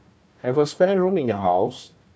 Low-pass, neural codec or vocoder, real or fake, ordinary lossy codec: none; codec, 16 kHz, 1 kbps, FunCodec, trained on Chinese and English, 50 frames a second; fake; none